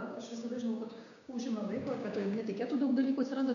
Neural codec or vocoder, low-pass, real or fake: codec, 16 kHz, 6 kbps, DAC; 7.2 kHz; fake